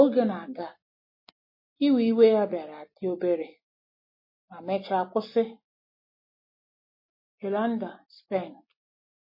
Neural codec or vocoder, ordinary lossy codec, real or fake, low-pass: none; MP3, 24 kbps; real; 5.4 kHz